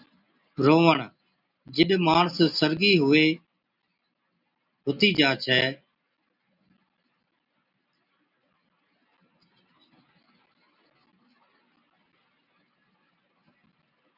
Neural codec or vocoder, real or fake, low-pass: none; real; 5.4 kHz